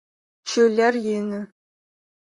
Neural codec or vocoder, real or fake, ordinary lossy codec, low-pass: vocoder, 44.1 kHz, 128 mel bands, Pupu-Vocoder; fake; Opus, 64 kbps; 10.8 kHz